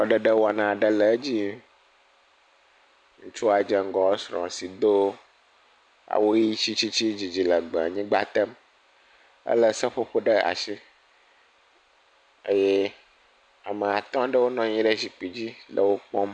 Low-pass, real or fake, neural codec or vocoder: 9.9 kHz; real; none